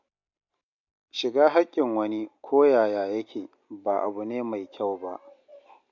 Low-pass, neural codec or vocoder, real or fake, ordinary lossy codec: 7.2 kHz; none; real; MP3, 48 kbps